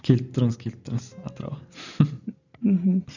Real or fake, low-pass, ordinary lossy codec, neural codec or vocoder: real; 7.2 kHz; none; none